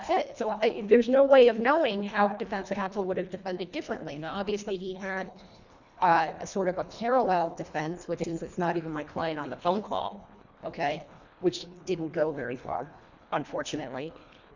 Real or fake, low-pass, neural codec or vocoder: fake; 7.2 kHz; codec, 24 kHz, 1.5 kbps, HILCodec